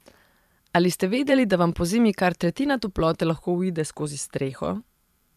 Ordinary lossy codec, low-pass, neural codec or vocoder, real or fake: none; 14.4 kHz; vocoder, 44.1 kHz, 128 mel bands every 512 samples, BigVGAN v2; fake